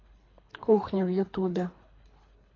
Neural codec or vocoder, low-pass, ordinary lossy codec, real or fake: codec, 24 kHz, 3 kbps, HILCodec; 7.2 kHz; AAC, 32 kbps; fake